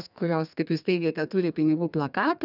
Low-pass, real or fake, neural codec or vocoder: 5.4 kHz; fake; codec, 32 kHz, 1.9 kbps, SNAC